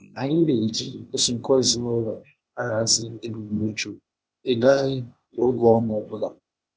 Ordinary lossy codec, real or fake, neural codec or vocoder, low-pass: none; fake; codec, 16 kHz, 0.8 kbps, ZipCodec; none